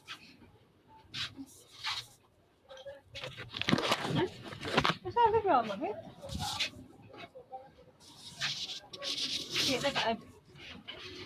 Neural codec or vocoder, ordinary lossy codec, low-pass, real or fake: vocoder, 44.1 kHz, 128 mel bands, Pupu-Vocoder; AAC, 96 kbps; 14.4 kHz; fake